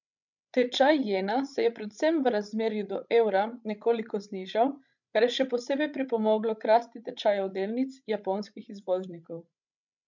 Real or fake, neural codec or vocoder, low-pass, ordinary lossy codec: fake; codec, 16 kHz, 16 kbps, FreqCodec, larger model; 7.2 kHz; none